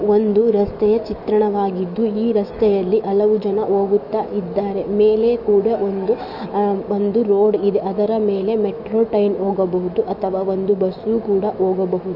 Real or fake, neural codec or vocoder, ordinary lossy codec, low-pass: fake; autoencoder, 48 kHz, 128 numbers a frame, DAC-VAE, trained on Japanese speech; none; 5.4 kHz